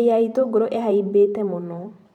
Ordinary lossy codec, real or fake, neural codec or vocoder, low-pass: none; fake; vocoder, 44.1 kHz, 128 mel bands every 512 samples, BigVGAN v2; 19.8 kHz